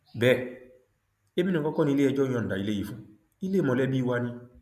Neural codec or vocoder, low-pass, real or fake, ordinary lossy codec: none; 14.4 kHz; real; none